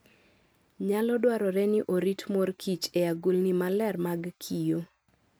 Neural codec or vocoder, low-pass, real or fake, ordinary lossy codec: none; none; real; none